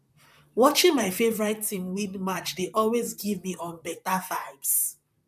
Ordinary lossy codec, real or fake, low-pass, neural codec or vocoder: none; fake; 14.4 kHz; vocoder, 44.1 kHz, 128 mel bands, Pupu-Vocoder